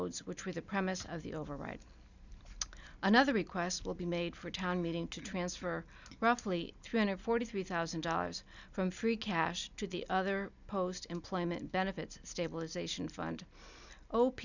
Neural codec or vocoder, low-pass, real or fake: none; 7.2 kHz; real